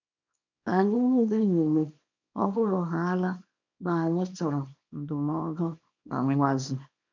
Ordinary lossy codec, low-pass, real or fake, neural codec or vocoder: none; 7.2 kHz; fake; codec, 24 kHz, 0.9 kbps, WavTokenizer, small release